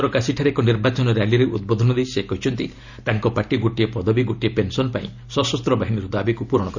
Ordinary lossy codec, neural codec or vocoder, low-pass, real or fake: none; none; 7.2 kHz; real